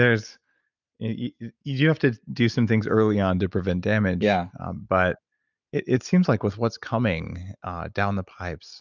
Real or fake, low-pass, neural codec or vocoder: real; 7.2 kHz; none